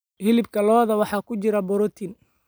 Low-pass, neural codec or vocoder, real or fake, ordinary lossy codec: none; none; real; none